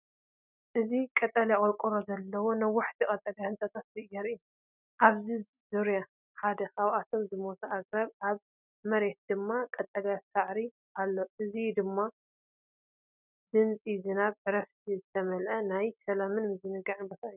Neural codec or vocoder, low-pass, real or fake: none; 3.6 kHz; real